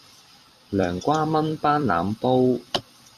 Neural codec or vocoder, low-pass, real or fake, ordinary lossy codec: vocoder, 44.1 kHz, 128 mel bands every 256 samples, BigVGAN v2; 14.4 kHz; fake; MP3, 96 kbps